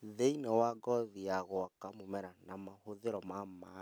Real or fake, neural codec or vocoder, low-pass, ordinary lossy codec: real; none; none; none